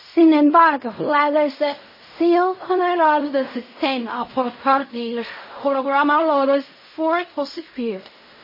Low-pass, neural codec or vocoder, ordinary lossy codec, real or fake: 5.4 kHz; codec, 16 kHz in and 24 kHz out, 0.4 kbps, LongCat-Audio-Codec, fine tuned four codebook decoder; MP3, 24 kbps; fake